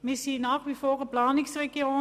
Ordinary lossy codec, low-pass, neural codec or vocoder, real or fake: none; 14.4 kHz; none; real